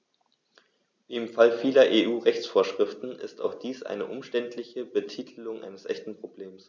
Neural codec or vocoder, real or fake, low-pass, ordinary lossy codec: none; real; none; none